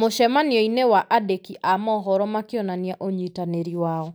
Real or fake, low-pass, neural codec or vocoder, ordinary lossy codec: real; none; none; none